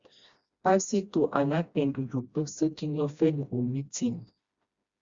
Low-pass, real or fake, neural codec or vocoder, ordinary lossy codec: 7.2 kHz; fake; codec, 16 kHz, 1 kbps, FreqCodec, smaller model; Opus, 64 kbps